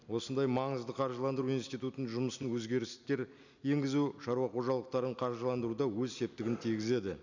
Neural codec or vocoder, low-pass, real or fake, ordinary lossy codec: none; 7.2 kHz; real; none